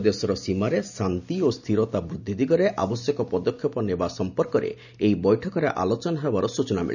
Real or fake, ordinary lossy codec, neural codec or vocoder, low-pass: real; none; none; 7.2 kHz